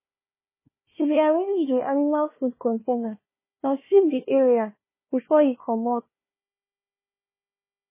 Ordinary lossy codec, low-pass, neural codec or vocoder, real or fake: MP3, 16 kbps; 3.6 kHz; codec, 16 kHz, 1 kbps, FunCodec, trained on Chinese and English, 50 frames a second; fake